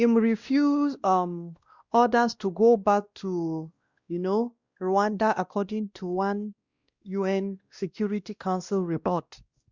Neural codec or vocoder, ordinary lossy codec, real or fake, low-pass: codec, 16 kHz, 1 kbps, X-Codec, WavLM features, trained on Multilingual LibriSpeech; Opus, 64 kbps; fake; 7.2 kHz